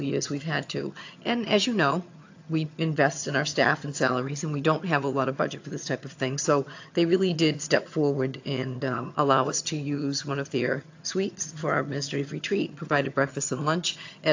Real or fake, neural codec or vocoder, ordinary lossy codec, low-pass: fake; vocoder, 22.05 kHz, 80 mel bands, HiFi-GAN; AAC, 48 kbps; 7.2 kHz